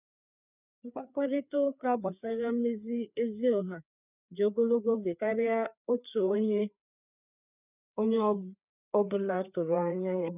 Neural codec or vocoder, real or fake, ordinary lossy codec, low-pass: codec, 16 kHz, 4 kbps, FreqCodec, larger model; fake; none; 3.6 kHz